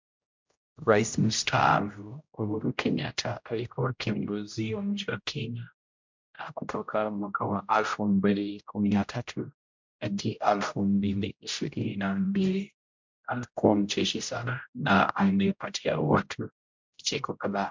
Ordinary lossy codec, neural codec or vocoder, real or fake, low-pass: MP3, 64 kbps; codec, 16 kHz, 0.5 kbps, X-Codec, HuBERT features, trained on general audio; fake; 7.2 kHz